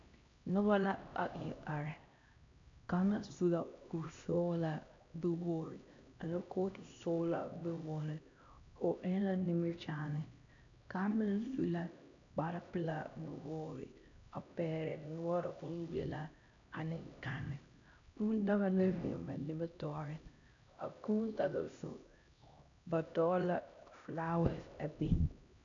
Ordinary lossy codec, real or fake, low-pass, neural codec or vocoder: AAC, 64 kbps; fake; 7.2 kHz; codec, 16 kHz, 1 kbps, X-Codec, HuBERT features, trained on LibriSpeech